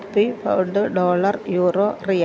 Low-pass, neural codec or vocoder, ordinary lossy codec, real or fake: none; none; none; real